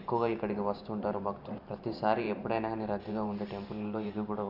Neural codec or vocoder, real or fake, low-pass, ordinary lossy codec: vocoder, 44.1 kHz, 128 mel bands every 512 samples, BigVGAN v2; fake; 5.4 kHz; none